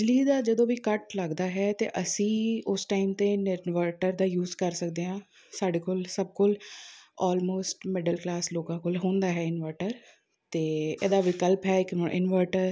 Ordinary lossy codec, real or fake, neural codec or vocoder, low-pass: none; real; none; none